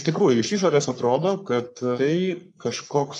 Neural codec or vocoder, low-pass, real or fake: codec, 44.1 kHz, 3.4 kbps, Pupu-Codec; 10.8 kHz; fake